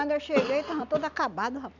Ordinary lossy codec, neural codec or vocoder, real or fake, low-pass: none; none; real; 7.2 kHz